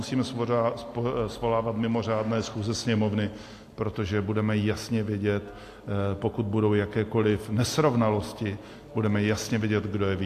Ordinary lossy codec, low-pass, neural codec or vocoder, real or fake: AAC, 64 kbps; 14.4 kHz; none; real